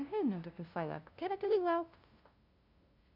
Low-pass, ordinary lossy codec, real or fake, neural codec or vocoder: 5.4 kHz; Opus, 64 kbps; fake; codec, 16 kHz, 0.5 kbps, FunCodec, trained on LibriTTS, 25 frames a second